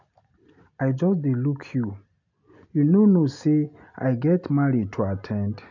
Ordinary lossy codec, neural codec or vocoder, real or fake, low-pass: none; none; real; 7.2 kHz